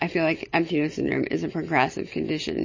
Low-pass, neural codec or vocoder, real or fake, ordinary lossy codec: 7.2 kHz; none; real; MP3, 32 kbps